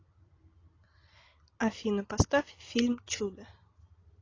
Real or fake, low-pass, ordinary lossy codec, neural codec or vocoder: real; 7.2 kHz; AAC, 32 kbps; none